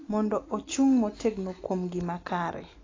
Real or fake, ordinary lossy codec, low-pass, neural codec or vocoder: real; AAC, 32 kbps; 7.2 kHz; none